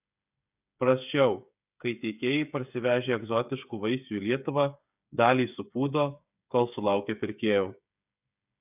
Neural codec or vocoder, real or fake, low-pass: codec, 16 kHz, 8 kbps, FreqCodec, smaller model; fake; 3.6 kHz